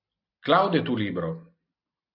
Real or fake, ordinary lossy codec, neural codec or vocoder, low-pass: real; MP3, 48 kbps; none; 5.4 kHz